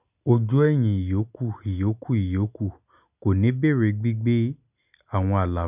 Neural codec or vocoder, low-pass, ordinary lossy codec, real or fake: none; 3.6 kHz; none; real